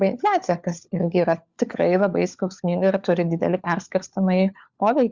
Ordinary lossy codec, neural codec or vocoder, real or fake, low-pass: Opus, 64 kbps; codec, 16 kHz, 4 kbps, FunCodec, trained on LibriTTS, 50 frames a second; fake; 7.2 kHz